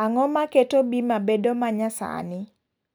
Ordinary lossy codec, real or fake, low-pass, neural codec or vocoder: none; real; none; none